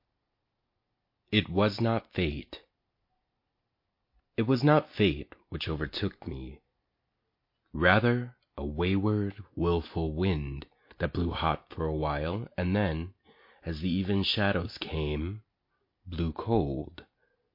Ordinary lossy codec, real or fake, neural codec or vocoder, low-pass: MP3, 32 kbps; real; none; 5.4 kHz